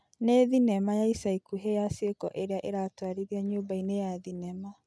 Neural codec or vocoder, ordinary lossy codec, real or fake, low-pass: none; none; real; none